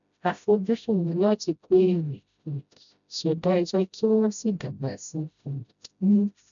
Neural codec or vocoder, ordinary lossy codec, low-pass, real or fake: codec, 16 kHz, 0.5 kbps, FreqCodec, smaller model; none; 7.2 kHz; fake